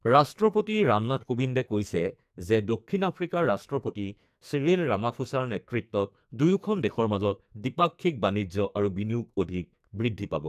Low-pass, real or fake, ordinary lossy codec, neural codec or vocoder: 14.4 kHz; fake; AAC, 96 kbps; codec, 44.1 kHz, 2.6 kbps, SNAC